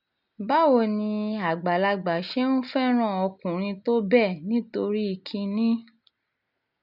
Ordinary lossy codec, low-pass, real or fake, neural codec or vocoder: none; 5.4 kHz; real; none